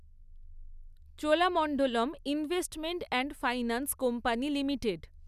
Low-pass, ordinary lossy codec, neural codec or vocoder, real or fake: 14.4 kHz; none; none; real